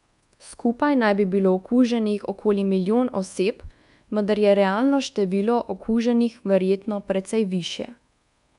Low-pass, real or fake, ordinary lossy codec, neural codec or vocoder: 10.8 kHz; fake; none; codec, 24 kHz, 1.2 kbps, DualCodec